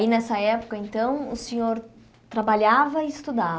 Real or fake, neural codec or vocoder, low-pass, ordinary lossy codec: real; none; none; none